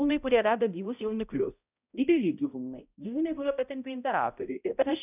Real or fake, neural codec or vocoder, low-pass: fake; codec, 16 kHz, 0.5 kbps, X-Codec, HuBERT features, trained on balanced general audio; 3.6 kHz